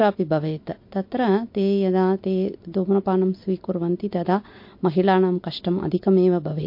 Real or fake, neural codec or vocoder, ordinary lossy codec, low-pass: real; none; MP3, 32 kbps; 5.4 kHz